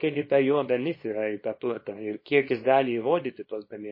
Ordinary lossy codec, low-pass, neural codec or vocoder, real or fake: MP3, 24 kbps; 5.4 kHz; codec, 24 kHz, 0.9 kbps, WavTokenizer, small release; fake